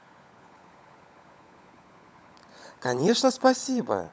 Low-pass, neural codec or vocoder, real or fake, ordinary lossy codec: none; codec, 16 kHz, 16 kbps, FunCodec, trained on LibriTTS, 50 frames a second; fake; none